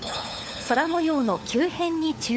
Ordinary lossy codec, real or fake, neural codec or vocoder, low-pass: none; fake; codec, 16 kHz, 4 kbps, FunCodec, trained on LibriTTS, 50 frames a second; none